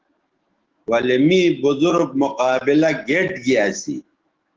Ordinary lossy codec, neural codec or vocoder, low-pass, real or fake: Opus, 16 kbps; autoencoder, 48 kHz, 128 numbers a frame, DAC-VAE, trained on Japanese speech; 7.2 kHz; fake